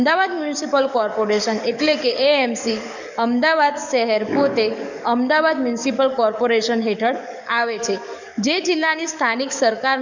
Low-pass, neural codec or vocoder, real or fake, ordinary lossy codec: 7.2 kHz; codec, 44.1 kHz, 7.8 kbps, DAC; fake; none